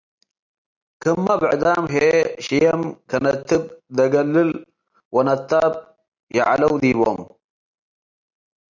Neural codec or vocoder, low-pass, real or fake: none; 7.2 kHz; real